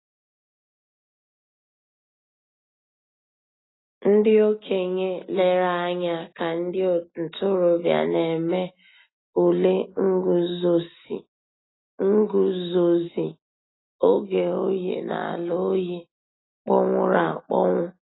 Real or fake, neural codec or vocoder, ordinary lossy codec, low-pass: real; none; AAC, 16 kbps; 7.2 kHz